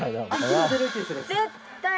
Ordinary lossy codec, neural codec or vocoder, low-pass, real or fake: none; none; none; real